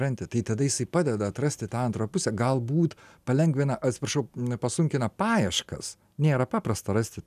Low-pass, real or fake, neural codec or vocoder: 14.4 kHz; real; none